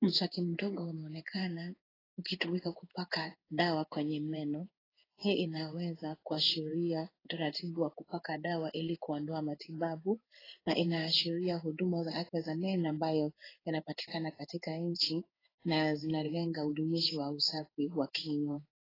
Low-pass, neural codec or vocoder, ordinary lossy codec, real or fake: 5.4 kHz; codec, 16 kHz in and 24 kHz out, 1 kbps, XY-Tokenizer; AAC, 24 kbps; fake